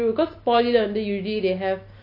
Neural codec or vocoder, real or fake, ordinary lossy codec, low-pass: none; real; none; 5.4 kHz